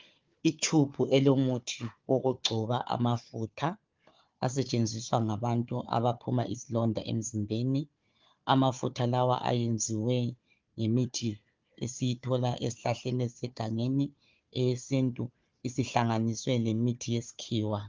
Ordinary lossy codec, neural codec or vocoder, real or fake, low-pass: Opus, 32 kbps; codec, 16 kHz, 4 kbps, FunCodec, trained on Chinese and English, 50 frames a second; fake; 7.2 kHz